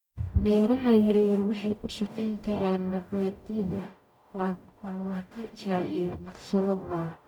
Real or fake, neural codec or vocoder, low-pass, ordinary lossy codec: fake; codec, 44.1 kHz, 0.9 kbps, DAC; 19.8 kHz; none